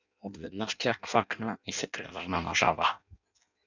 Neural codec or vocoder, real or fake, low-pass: codec, 16 kHz in and 24 kHz out, 0.6 kbps, FireRedTTS-2 codec; fake; 7.2 kHz